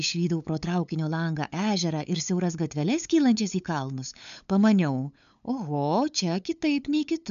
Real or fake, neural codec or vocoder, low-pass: fake; codec, 16 kHz, 8 kbps, FunCodec, trained on LibriTTS, 25 frames a second; 7.2 kHz